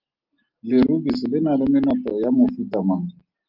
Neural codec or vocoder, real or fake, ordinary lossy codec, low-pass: none; real; Opus, 24 kbps; 5.4 kHz